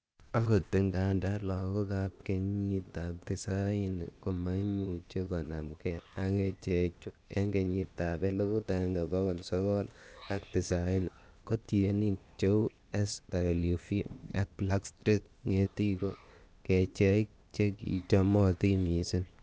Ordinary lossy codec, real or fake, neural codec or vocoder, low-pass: none; fake; codec, 16 kHz, 0.8 kbps, ZipCodec; none